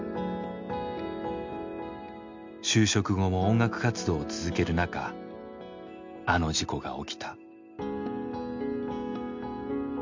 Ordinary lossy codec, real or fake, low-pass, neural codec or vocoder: MP3, 64 kbps; real; 7.2 kHz; none